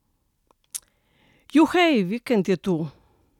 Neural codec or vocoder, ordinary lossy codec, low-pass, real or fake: none; none; 19.8 kHz; real